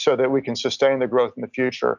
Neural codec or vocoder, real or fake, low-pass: none; real; 7.2 kHz